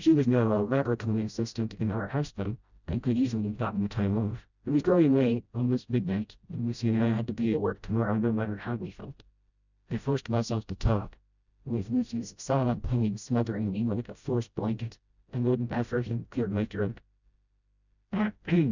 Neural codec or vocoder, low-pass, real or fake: codec, 16 kHz, 0.5 kbps, FreqCodec, smaller model; 7.2 kHz; fake